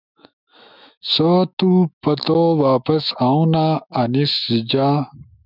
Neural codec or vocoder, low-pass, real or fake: autoencoder, 48 kHz, 128 numbers a frame, DAC-VAE, trained on Japanese speech; 5.4 kHz; fake